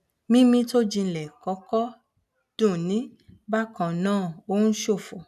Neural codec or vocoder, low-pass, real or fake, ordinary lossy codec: none; 14.4 kHz; real; none